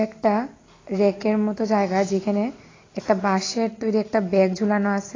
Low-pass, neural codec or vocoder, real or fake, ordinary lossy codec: 7.2 kHz; none; real; AAC, 32 kbps